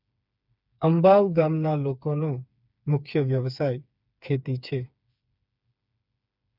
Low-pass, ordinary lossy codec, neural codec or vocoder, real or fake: 5.4 kHz; none; codec, 16 kHz, 4 kbps, FreqCodec, smaller model; fake